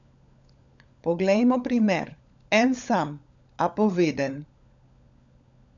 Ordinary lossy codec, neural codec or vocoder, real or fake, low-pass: none; codec, 16 kHz, 16 kbps, FunCodec, trained on LibriTTS, 50 frames a second; fake; 7.2 kHz